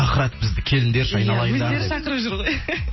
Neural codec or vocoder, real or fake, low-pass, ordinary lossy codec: none; real; 7.2 kHz; MP3, 24 kbps